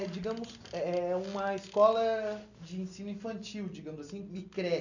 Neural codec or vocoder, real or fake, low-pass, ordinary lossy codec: none; real; 7.2 kHz; none